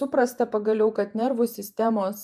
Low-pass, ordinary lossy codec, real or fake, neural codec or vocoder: 14.4 kHz; MP3, 96 kbps; fake; vocoder, 48 kHz, 128 mel bands, Vocos